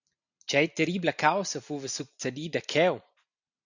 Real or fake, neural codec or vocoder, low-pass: real; none; 7.2 kHz